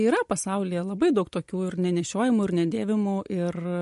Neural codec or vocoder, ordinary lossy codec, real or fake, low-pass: none; MP3, 48 kbps; real; 14.4 kHz